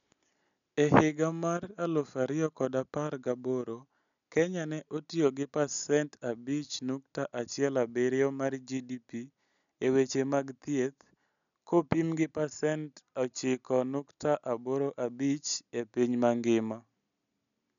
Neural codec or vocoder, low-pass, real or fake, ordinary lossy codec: none; 7.2 kHz; real; none